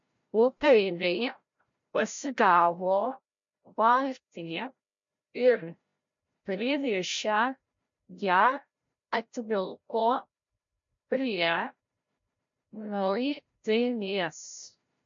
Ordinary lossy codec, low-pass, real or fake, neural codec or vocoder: MP3, 48 kbps; 7.2 kHz; fake; codec, 16 kHz, 0.5 kbps, FreqCodec, larger model